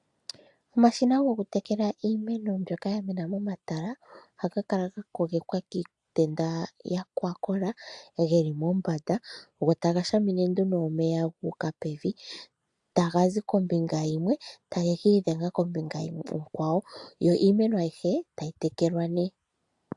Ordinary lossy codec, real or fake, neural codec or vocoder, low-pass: AAC, 64 kbps; real; none; 10.8 kHz